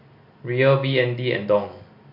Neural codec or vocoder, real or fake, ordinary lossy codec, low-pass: none; real; MP3, 48 kbps; 5.4 kHz